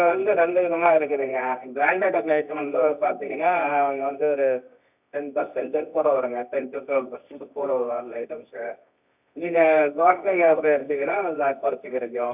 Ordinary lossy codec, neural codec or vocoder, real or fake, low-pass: none; codec, 24 kHz, 0.9 kbps, WavTokenizer, medium music audio release; fake; 3.6 kHz